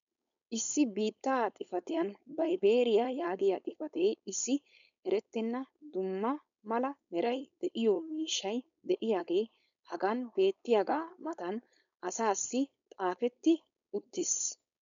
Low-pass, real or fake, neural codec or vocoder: 7.2 kHz; fake; codec, 16 kHz, 4.8 kbps, FACodec